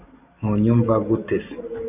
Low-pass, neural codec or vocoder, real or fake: 3.6 kHz; none; real